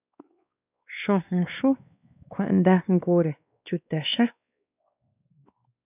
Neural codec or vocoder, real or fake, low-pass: codec, 16 kHz, 2 kbps, X-Codec, WavLM features, trained on Multilingual LibriSpeech; fake; 3.6 kHz